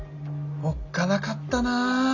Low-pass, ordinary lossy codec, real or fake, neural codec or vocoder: 7.2 kHz; none; real; none